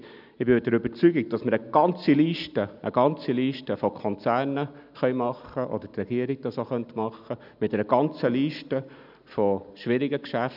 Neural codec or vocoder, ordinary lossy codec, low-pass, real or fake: none; none; 5.4 kHz; real